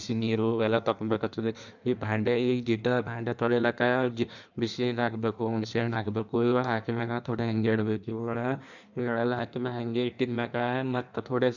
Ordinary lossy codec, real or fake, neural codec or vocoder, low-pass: none; fake; codec, 16 kHz in and 24 kHz out, 1.1 kbps, FireRedTTS-2 codec; 7.2 kHz